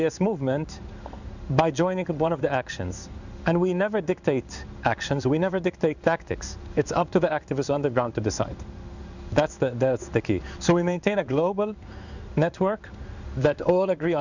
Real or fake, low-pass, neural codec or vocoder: fake; 7.2 kHz; codec, 16 kHz in and 24 kHz out, 1 kbps, XY-Tokenizer